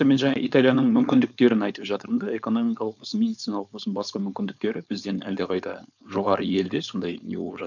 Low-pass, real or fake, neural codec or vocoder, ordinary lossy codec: 7.2 kHz; fake; codec, 16 kHz, 4.8 kbps, FACodec; none